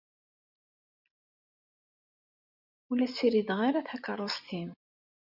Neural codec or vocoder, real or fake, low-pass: none; real; 5.4 kHz